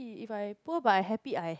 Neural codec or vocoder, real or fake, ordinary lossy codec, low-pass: none; real; none; none